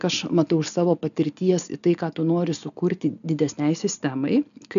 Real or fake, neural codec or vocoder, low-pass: real; none; 7.2 kHz